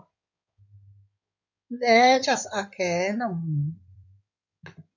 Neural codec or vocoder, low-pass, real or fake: codec, 16 kHz in and 24 kHz out, 2.2 kbps, FireRedTTS-2 codec; 7.2 kHz; fake